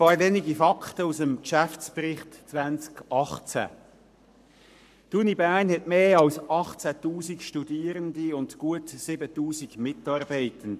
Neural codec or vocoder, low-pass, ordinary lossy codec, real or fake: codec, 44.1 kHz, 7.8 kbps, Pupu-Codec; 14.4 kHz; none; fake